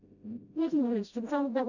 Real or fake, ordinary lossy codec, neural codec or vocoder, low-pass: fake; none; codec, 16 kHz, 0.5 kbps, FreqCodec, smaller model; 7.2 kHz